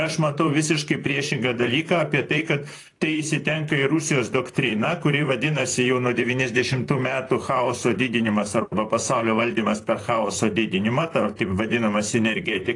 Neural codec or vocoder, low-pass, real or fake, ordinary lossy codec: vocoder, 44.1 kHz, 128 mel bands, Pupu-Vocoder; 10.8 kHz; fake; AAC, 48 kbps